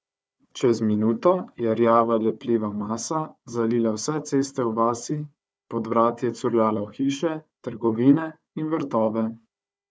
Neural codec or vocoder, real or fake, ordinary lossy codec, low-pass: codec, 16 kHz, 4 kbps, FunCodec, trained on Chinese and English, 50 frames a second; fake; none; none